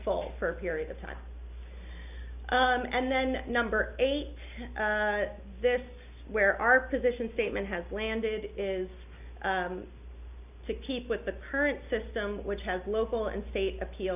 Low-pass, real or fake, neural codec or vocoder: 3.6 kHz; real; none